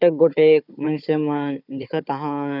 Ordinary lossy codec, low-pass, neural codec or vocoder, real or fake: none; 5.4 kHz; codec, 16 kHz, 4 kbps, FunCodec, trained on Chinese and English, 50 frames a second; fake